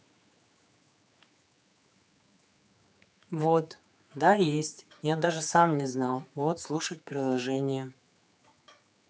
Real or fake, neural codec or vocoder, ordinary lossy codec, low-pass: fake; codec, 16 kHz, 4 kbps, X-Codec, HuBERT features, trained on general audio; none; none